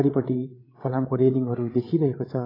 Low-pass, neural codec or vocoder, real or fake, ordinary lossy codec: 5.4 kHz; codec, 16 kHz, 8 kbps, FreqCodec, larger model; fake; AAC, 24 kbps